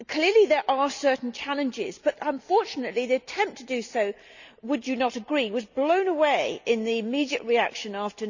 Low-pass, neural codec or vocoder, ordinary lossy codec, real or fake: 7.2 kHz; none; none; real